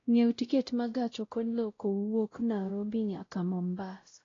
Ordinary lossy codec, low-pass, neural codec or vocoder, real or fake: AAC, 32 kbps; 7.2 kHz; codec, 16 kHz, 0.5 kbps, X-Codec, WavLM features, trained on Multilingual LibriSpeech; fake